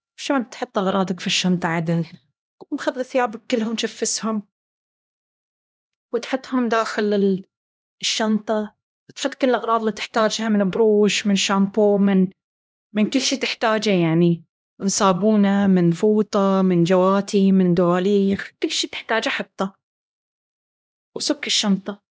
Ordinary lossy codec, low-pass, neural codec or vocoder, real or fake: none; none; codec, 16 kHz, 1 kbps, X-Codec, HuBERT features, trained on LibriSpeech; fake